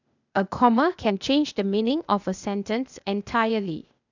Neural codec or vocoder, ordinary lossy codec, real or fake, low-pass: codec, 16 kHz, 0.8 kbps, ZipCodec; none; fake; 7.2 kHz